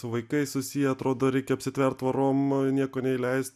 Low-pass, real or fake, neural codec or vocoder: 14.4 kHz; real; none